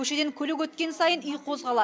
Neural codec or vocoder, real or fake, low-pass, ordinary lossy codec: none; real; none; none